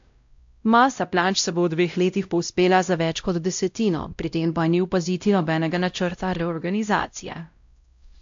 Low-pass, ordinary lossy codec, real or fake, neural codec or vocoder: 7.2 kHz; AAC, 64 kbps; fake; codec, 16 kHz, 0.5 kbps, X-Codec, WavLM features, trained on Multilingual LibriSpeech